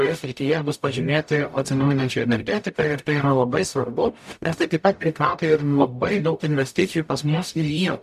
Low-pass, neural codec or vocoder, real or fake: 14.4 kHz; codec, 44.1 kHz, 0.9 kbps, DAC; fake